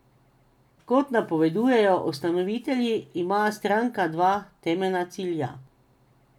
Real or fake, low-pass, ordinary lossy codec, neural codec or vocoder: real; 19.8 kHz; none; none